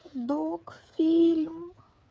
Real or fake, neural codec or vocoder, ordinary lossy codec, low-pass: fake; codec, 16 kHz, 8 kbps, FreqCodec, smaller model; none; none